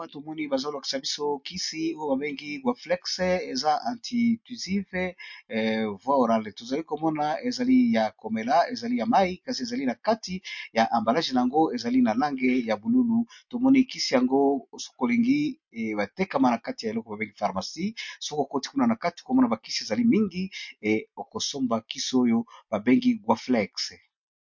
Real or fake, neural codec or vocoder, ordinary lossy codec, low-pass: real; none; MP3, 48 kbps; 7.2 kHz